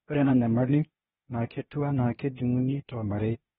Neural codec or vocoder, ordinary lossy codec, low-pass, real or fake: codec, 16 kHz, 0.8 kbps, ZipCodec; AAC, 16 kbps; 7.2 kHz; fake